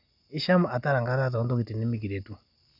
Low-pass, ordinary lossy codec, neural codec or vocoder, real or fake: 5.4 kHz; none; none; real